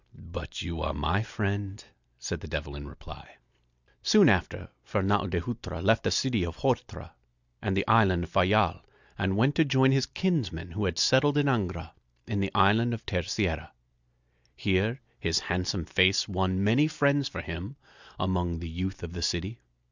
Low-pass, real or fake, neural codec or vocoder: 7.2 kHz; real; none